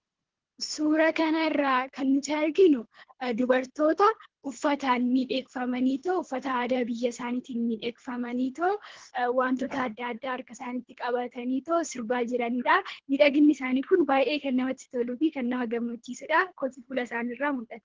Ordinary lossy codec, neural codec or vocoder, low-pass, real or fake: Opus, 16 kbps; codec, 24 kHz, 3 kbps, HILCodec; 7.2 kHz; fake